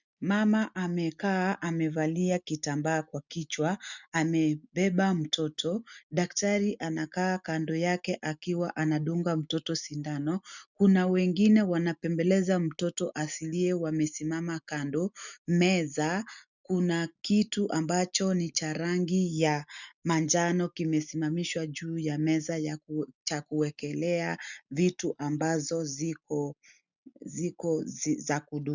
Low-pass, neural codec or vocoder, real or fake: 7.2 kHz; none; real